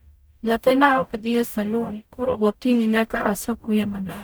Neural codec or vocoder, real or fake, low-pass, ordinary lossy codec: codec, 44.1 kHz, 0.9 kbps, DAC; fake; none; none